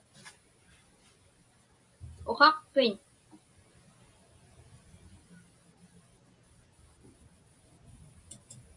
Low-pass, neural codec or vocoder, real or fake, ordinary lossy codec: 10.8 kHz; none; real; AAC, 64 kbps